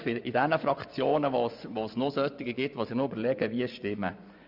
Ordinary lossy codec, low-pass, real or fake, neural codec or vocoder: none; 5.4 kHz; fake; vocoder, 24 kHz, 100 mel bands, Vocos